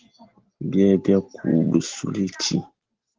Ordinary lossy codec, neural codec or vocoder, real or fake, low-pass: Opus, 16 kbps; none; real; 7.2 kHz